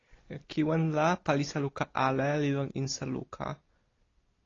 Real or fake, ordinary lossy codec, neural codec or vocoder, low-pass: real; AAC, 32 kbps; none; 7.2 kHz